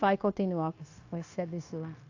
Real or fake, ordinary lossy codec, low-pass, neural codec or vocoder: fake; AAC, 48 kbps; 7.2 kHz; codec, 16 kHz, 0.9 kbps, LongCat-Audio-Codec